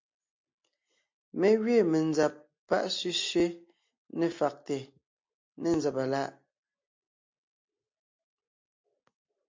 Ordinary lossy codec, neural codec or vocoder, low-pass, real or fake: MP3, 48 kbps; none; 7.2 kHz; real